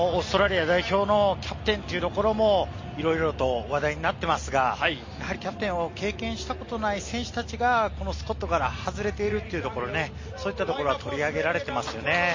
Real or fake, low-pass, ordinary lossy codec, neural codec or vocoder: real; 7.2 kHz; MP3, 32 kbps; none